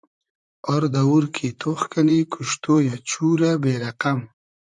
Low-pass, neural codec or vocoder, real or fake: 10.8 kHz; vocoder, 44.1 kHz, 128 mel bands, Pupu-Vocoder; fake